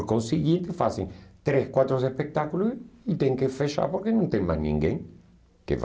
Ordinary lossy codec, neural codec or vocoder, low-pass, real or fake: none; none; none; real